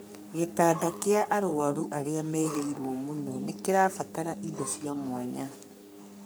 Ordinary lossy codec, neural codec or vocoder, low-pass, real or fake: none; codec, 44.1 kHz, 3.4 kbps, Pupu-Codec; none; fake